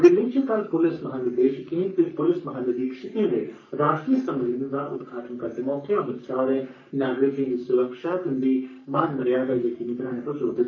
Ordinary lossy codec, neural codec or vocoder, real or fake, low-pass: none; codec, 44.1 kHz, 3.4 kbps, Pupu-Codec; fake; 7.2 kHz